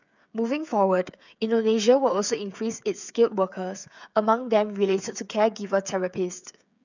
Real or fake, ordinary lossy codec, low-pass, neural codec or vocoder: fake; none; 7.2 kHz; codec, 16 kHz, 8 kbps, FreqCodec, smaller model